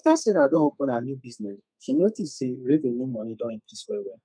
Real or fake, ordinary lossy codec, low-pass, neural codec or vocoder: fake; none; 9.9 kHz; codec, 32 kHz, 1.9 kbps, SNAC